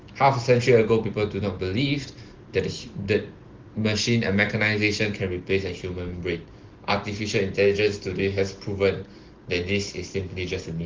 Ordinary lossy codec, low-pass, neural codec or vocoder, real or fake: Opus, 16 kbps; 7.2 kHz; none; real